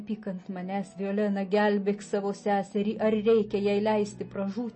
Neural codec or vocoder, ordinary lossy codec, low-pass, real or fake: none; MP3, 32 kbps; 9.9 kHz; real